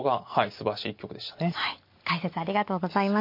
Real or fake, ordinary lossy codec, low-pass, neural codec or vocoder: real; MP3, 32 kbps; 5.4 kHz; none